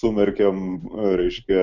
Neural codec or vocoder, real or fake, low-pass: none; real; 7.2 kHz